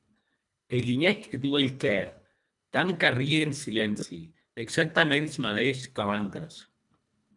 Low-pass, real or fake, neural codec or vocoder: 10.8 kHz; fake; codec, 24 kHz, 1.5 kbps, HILCodec